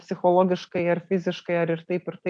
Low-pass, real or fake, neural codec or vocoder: 9.9 kHz; real; none